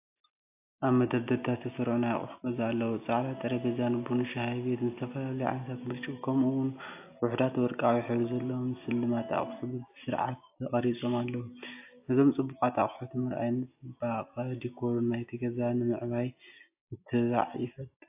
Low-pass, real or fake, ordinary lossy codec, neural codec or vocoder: 3.6 kHz; real; AAC, 32 kbps; none